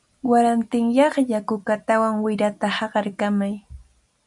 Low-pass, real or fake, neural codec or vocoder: 10.8 kHz; real; none